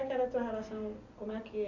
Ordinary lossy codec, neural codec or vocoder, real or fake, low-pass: none; none; real; 7.2 kHz